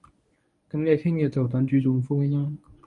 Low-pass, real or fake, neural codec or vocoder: 10.8 kHz; fake; codec, 24 kHz, 0.9 kbps, WavTokenizer, medium speech release version 1